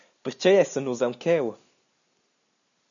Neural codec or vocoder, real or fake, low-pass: none; real; 7.2 kHz